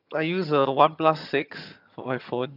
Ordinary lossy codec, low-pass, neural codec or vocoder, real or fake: none; 5.4 kHz; vocoder, 22.05 kHz, 80 mel bands, HiFi-GAN; fake